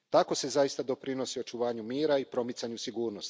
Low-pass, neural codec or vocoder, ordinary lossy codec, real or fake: none; none; none; real